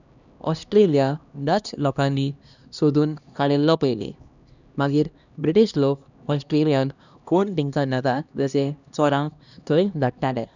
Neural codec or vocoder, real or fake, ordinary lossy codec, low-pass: codec, 16 kHz, 1 kbps, X-Codec, HuBERT features, trained on LibriSpeech; fake; none; 7.2 kHz